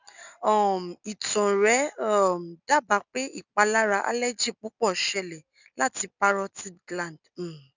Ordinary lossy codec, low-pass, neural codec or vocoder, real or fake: none; 7.2 kHz; none; real